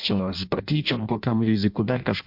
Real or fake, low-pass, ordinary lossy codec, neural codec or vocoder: fake; 5.4 kHz; MP3, 48 kbps; codec, 16 kHz in and 24 kHz out, 0.6 kbps, FireRedTTS-2 codec